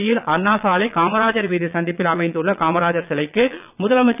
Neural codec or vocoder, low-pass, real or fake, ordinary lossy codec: vocoder, 22.05 kHz, 80 mel bands, Vocos; 3.6 kHz; fake; none